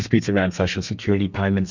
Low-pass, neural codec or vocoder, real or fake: 7.2 kHz; codec, 32 kHz, 1.9 kbps, SNAC; fake